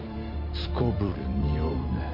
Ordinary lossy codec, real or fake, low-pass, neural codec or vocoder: MP3, 32 kbps; fake; 5.4 kHz; vocoder, 44.1 kHz, 128 mel bands every 256 samples, BigVGAN v2